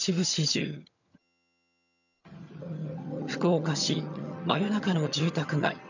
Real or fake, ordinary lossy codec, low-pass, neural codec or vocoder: fake; none; 7.2 kHz; vocoder, 22.05 kHz, 80 mel bands, HiFi-GAN